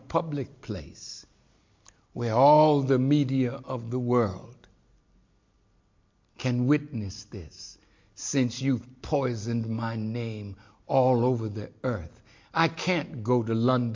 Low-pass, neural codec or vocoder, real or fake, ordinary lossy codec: 7.2 kHz; none; real; MP3, 48 kbps